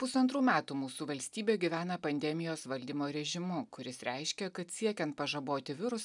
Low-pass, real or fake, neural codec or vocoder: 10.8 kHz; real; none